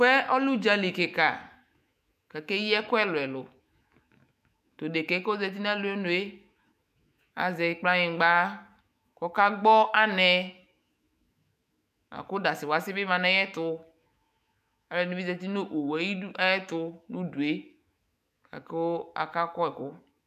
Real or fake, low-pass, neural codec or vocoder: fake; 14.4 kHz; autoencoder, 48 kHz, 128 numbers a frame, DAC-VAE, trained on Japanese speech